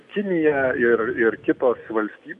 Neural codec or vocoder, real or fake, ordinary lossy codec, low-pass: none; real; MP3, 96 kbps; 10.8 kHz